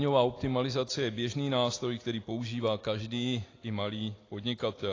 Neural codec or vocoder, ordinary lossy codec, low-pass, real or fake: none; AAC, 32 kbps; 7.2 kHz; real